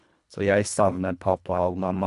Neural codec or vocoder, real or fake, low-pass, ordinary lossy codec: codec, 24 kHz, 1.5 kbps, HILCodec; fake; 10.8 kHz; none